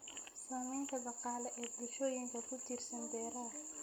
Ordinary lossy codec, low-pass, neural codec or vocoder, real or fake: none; none; none; real